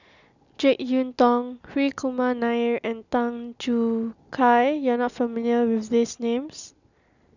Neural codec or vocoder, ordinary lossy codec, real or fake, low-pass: none; none; real; 7.2 kHz